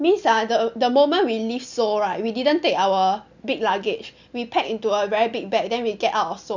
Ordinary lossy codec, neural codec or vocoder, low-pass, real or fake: none; none; 7.2 kHz; real